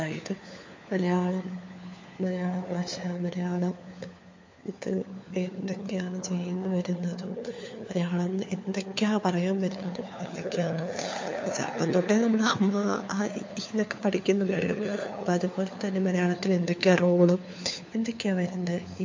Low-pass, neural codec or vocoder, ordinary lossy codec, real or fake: 7.2 kHz; codec, 16 kHz, 4 kbps, FunCodec, trained on LibriTTS, 50 frames a second; MP3, 48 kbps; fake